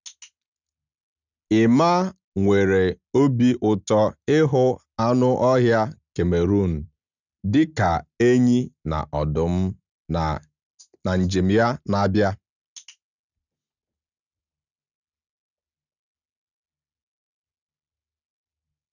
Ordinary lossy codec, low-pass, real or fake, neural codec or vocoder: none; 7.2 kHz; real; none